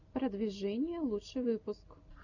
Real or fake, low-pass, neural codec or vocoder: real; 7.2 kHz; none